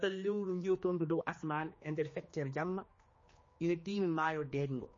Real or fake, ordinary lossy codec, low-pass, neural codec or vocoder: fake; MP3, 32 kbps; 7.2 kHz; codec, 16 kHz, 2 kbps, X-Codec, HuBERT features, trained on general audio